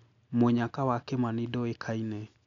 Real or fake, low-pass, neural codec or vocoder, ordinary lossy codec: real; 7.2 kHz; none; none